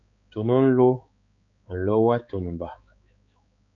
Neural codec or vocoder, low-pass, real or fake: codec, 16 kHz, 4 kbps, X-Codec, HuBERT features, trained on balanced general audio; 7.2 kHz; fake